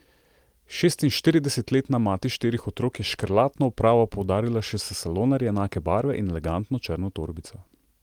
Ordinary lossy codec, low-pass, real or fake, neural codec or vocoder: Opus, 24 kbps; 19.8 kHz; real; none